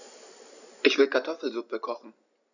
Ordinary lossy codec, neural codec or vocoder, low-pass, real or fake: none; none; 7.2 kHz; real